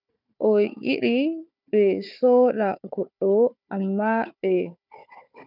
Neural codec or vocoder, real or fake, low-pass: codec, 16 kHz, 4 kbps, FunCodec, trained on Chinese and English, 50 frames a second; fake; 5.4 kHz